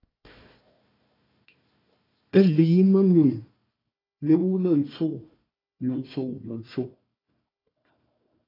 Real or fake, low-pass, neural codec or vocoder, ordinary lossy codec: fake; 5.4 kHz; codec, 16 kHz, 1 kbps, FunCodec, trained on Chinese and English, 50 frames a second; AAC, 24 kbps